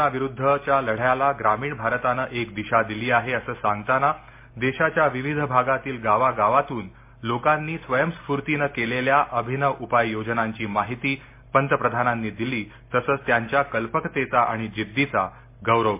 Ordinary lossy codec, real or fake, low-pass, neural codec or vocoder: MP3, 24 kbps; real; 3.6 kHz; none